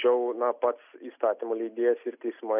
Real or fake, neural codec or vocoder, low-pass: real; none; 3.6 kHz